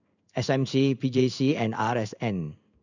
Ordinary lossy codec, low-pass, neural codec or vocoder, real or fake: none; 7.2 kHz; codec, 16 kHz in and 24 kHz out, 1 kbps, XY-Tokenizer; fake